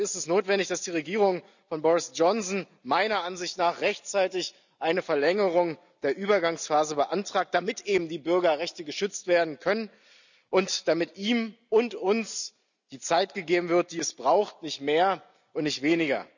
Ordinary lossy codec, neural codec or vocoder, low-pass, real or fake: none; none; 7.2 kHz; real